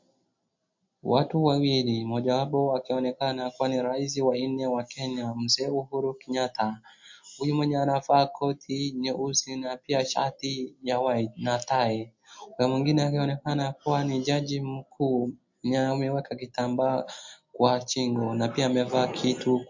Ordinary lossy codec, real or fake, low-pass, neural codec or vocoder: MP3, 48 kbps; real; 7.2 kHz; none